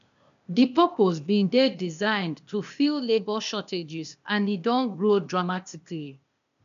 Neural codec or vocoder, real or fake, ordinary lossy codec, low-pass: codec, 16 kHz, 0.8 kbps, ZipCodec; fake; none; 7.2 kHz